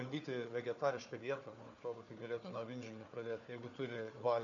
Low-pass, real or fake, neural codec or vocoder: 7.2 kHz; fake; codec, 16 kHz, 4 kbps, FunCodec, trained on Chinese and English, 50 frames a second